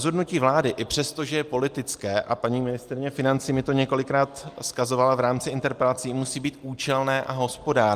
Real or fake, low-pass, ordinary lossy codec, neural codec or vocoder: real; 14.4 kHz; Opus, 32 kbps; none